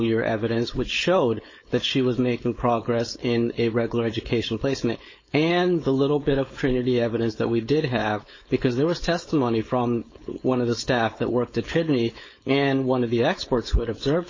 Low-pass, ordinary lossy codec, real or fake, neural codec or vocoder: 7.2 kHz; MP3, 32 kbps; fake; codec, 16 kHz, 4.8 kbps, FACodec